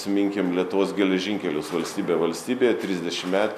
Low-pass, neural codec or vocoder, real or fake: 14.4 kHz; none; real